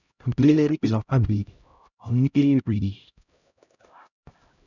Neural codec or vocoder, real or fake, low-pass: codec, 16 kHz, 0.5 kbps, X-Codec, HuBERT features, trained on LibriSpeech; fake; 7.2 kHz